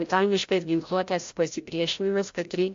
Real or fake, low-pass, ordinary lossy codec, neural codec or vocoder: fake; 7.2 kHz; AAC, 48 kbps; codec, 16 kHz, 0.5 kbps, FreqCodec, larger model